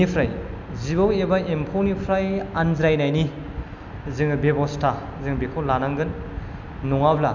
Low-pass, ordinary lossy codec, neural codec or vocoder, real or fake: 7.2 kHz; none; none; real